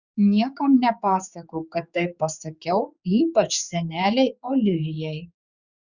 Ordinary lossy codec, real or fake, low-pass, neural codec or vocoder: Opus, 64 kbps; fake; 7.2 kHz; codec, 16 kHz, 4 kbps, X-Codec, HuBERT features, trained on general audio